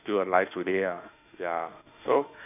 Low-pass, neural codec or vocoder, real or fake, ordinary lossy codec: 3.6 kHz; autoencoder, 48 kHz, 32 numbers a frame, DAC-VAE, trained on Japanese speech; fake; none